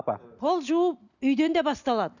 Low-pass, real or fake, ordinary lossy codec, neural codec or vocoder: 7.2 kHz; real; none; none